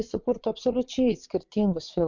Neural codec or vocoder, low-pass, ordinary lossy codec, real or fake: vocoder, 22.05 kHz, 80 mel bands, Vocos; 7.2 kHz; MP3, 64 kbps; fake